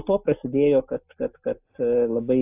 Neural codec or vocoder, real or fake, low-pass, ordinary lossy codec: none; real; 3.6 kHz; AAC, 32 kbps